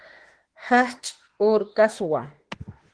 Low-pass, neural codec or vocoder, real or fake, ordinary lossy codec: 9.9 kHz; autoencoder, 48 kHz, 32 numbers a frame, DAC-VAE, trained on Japanese speech; fake; Opus, 16 kbps